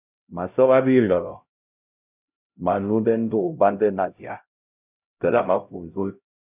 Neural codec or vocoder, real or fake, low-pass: codec, 16 kHz, 0.5 kbps, X-Codec, HuBERT features, trained on LibriSpeech; fake; 3.6 kHz